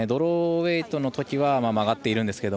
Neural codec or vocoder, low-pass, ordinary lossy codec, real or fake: none; none; none; real